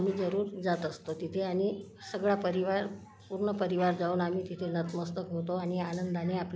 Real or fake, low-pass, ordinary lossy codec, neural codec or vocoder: real; none; none; none